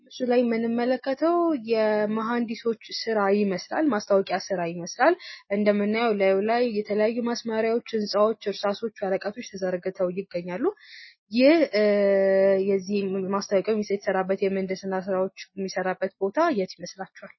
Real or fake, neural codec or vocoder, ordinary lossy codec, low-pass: real; none; MP3, 24 kbps; 7.2 kHz